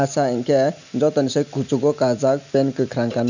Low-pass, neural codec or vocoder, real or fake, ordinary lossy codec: 7.2 kHz; none; real; none